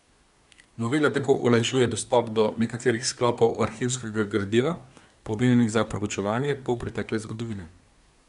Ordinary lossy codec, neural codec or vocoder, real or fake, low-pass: none; codec, 24 kHz, 1 kbps, SNAC; fake; 10.8 kHz